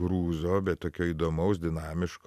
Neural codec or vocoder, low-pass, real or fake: none; 14.4 kHz; real